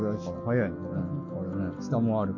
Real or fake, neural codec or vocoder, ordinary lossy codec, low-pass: real; none; none; 7.2 kHz